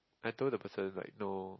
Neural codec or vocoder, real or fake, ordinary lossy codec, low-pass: none; real; MP3, 24 kbps; 7.2 kHz